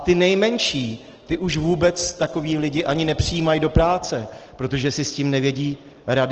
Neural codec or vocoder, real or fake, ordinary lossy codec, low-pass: none; real; Opus, 16 kbps; 7.2 kHz